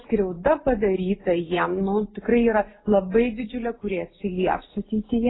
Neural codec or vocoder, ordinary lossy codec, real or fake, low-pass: none; AAC, 16 kbps; real; 7.2 kHz